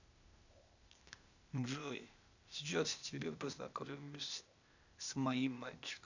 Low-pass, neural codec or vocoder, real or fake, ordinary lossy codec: 7.2 kHz; codec, 16 kHz, 0.8 kbps, ZipCodec; fake; none